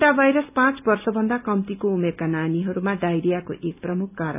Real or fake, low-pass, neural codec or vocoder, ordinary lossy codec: real; 3.6 kHz; none; none